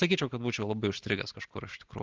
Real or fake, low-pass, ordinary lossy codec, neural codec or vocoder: real; 7.2 kHz; Opus, 16 kbps; none